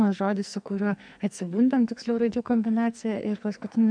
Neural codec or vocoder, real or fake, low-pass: codec, 44.1 kHz, 2.6 kbps, SNAC; fake; 9.9 kHz